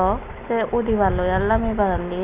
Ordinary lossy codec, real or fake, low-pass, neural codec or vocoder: none; real; 3.6 kHz; none